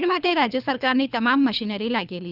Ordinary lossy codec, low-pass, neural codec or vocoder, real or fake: none; 5.4 kHz; codec, 24 kHz, 3 kbps, HILCodec; fake